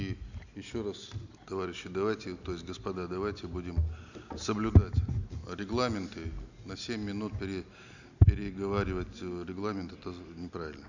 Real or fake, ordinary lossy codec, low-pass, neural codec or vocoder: real; none; 7.2 kHz; none